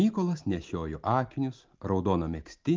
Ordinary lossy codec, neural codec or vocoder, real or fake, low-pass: Opus, 32 kbps; none; real; 7.2 kHz